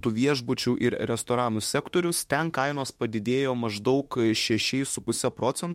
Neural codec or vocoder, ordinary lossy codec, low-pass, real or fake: autoencoder, 48 kHz, 32 numbers a frame, DAC-VAE, trained on Japanese speech; MP3, 64 kbps; 19.8 kHz; fake